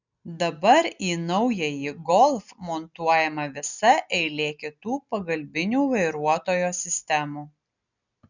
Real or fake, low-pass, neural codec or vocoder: real; 7.2 kHz; none